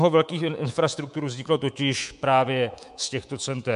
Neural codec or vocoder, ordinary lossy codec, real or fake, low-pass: codec, 24 kHz, 3.1 kbps, DualCodec; MP3, 64 kbps; fake; 10.8 kHz